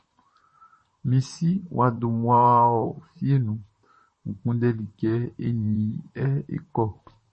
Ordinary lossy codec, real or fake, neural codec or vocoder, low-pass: MP3, 32 kbps; fake; vocoder, 44.1 kHz, 128 mel bands, Pupu-Vocoder; 10.8 kHz